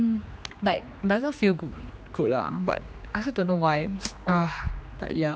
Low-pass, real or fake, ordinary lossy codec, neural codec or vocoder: none; fake; none; codec, 16 kHz, 2 kbps, X-Codec, HuBERT features, trained on general audio